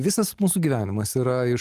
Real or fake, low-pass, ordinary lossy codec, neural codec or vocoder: real; 14.4 kHz; Opus, 24 kbps; none